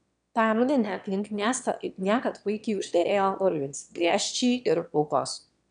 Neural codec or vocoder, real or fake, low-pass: autoencoder, 22.05 kHz, a latent of 192 numbers a frame, VITS, trained on one speaker; fake; 9.9 kHz